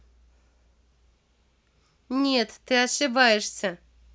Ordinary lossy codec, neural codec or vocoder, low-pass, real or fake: none; none; none; real